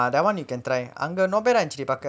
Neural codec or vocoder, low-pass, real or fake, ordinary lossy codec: none; none; real; none